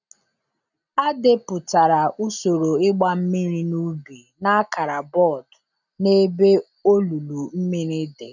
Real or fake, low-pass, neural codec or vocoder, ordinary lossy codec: real; 7.2 kHz; none; none